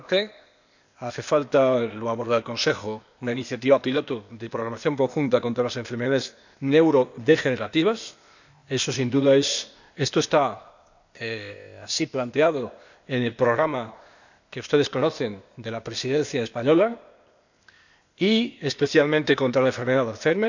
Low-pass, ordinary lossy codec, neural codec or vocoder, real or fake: 7.2 kHz; none; codec, 16 kHz, 0.8 kbps, ZipCodec; fake